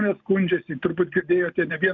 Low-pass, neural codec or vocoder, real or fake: 7.2 kHz; none; real